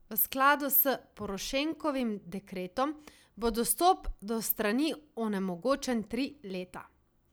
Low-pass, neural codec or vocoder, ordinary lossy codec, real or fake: none; none; none; real